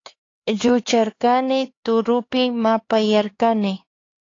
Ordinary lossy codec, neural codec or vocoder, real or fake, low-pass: AAC, 32 kbps; codec, 16 kHz, 4 kbps, X-Codec, HuBERT features, trained on LibriSpeech; fake; 7.2 kHz